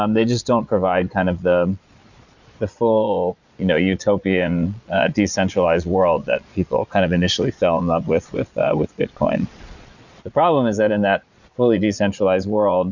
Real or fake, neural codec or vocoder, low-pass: fake; vocoder, 22.05 kHz, 80 mel bands, Vocos; 7.2 kHz